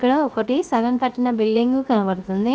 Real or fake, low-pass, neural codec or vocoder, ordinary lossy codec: fake; none; codec, 16 kHz, 0.7 kbps, FocalCodec; none